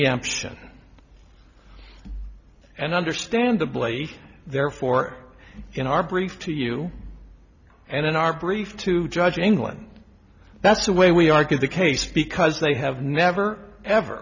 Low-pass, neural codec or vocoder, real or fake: 7.2 kHz; none; real